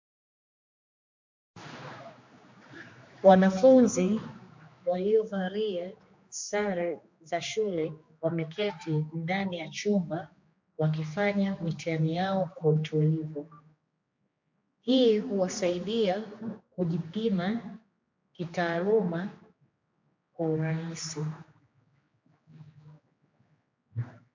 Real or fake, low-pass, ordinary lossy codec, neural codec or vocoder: fake; 7.2 kHz; MP3, 48 kbps; codec, 16 kHz, 2 kbps, X-Codec, HuBERT features, trained on general audio